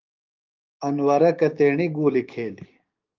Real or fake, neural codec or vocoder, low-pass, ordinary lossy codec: fake; codec, 16 kHz, 6 kbps, DAC; 7.2 kHz; Opus, 24 kbps